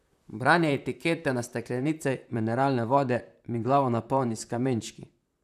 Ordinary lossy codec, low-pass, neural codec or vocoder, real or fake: none; 14.4 kHz; vocoder, 44.1 kHz, 128 mel bands, Pupu-Vocoder; fake